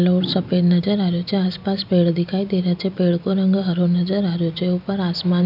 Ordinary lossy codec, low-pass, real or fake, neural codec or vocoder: none; 5.4 kHz; real; none